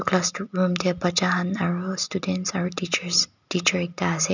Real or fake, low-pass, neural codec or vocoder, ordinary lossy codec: real; 7.2 kHz; none; none